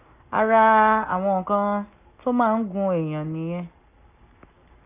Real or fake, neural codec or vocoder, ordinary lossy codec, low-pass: real; none; none; 3.6 kHz